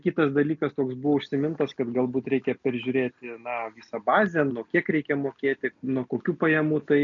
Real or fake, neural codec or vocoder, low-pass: real; none; 7.2 kHz